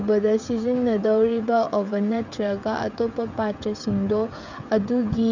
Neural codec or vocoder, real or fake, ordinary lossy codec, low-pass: codec, 16 kHz, 16 kbps, FreqCodec, smaller model; fake; none; 7.2 kHz